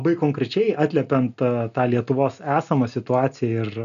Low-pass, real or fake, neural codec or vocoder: 7.2 kHz; real; none